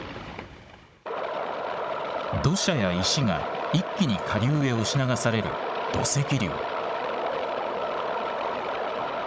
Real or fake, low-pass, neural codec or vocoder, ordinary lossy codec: fake; none; codec, 16 kHz, 16 kbps, FunCodec, trained on Chinese and English, 50 frames a second; none